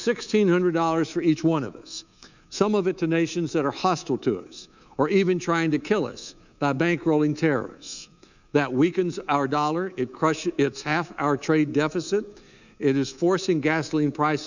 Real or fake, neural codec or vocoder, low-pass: fake; codec, 24 kHz, 3.1 kbps, DualCodec; 7.2 kHz